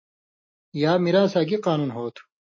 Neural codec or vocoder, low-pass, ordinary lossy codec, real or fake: none; 7.2 kHz; MP3, 32 kbps; real